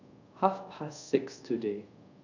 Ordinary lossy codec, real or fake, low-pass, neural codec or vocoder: none; fake; 7.2 kHz; codec, 24 kHz, 0.5 kbps, DualCodec